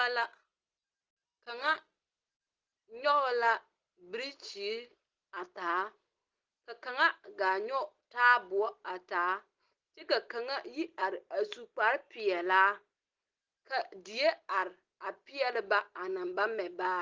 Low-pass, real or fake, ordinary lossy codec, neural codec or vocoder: 7.2 kHz; real; Opus, 16 kbps; none